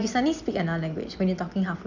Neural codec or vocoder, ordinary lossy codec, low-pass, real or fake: none; none; 7.2 kHz; real